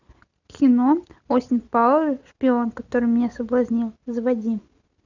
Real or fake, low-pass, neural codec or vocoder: real; 7.2 kHz; none